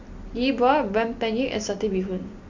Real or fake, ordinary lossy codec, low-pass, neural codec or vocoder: real; MP3, 48 kbps; 7.2 kHz; none